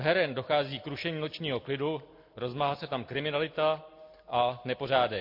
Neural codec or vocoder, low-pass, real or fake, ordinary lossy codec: none; 5.4 kHz; real; MP3, 32 kbps